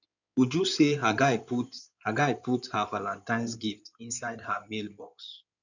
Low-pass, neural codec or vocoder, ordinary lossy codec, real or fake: 7.2 kHz; codec, 16 kHz in and 24 kHz out, 2.2 kbps, FireRedTTS-2 codec; none; fake